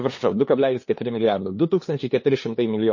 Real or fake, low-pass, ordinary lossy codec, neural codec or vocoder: fake; 7.2 kHz; MP3, 32 kbps; codec, 16 kHz, 2 kbps, FunCodec, trained on LibriTTS, 25 frames a second